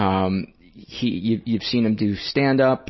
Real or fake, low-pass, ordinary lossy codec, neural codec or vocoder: real; 7.2 kHz; MP3, 24 kbps; none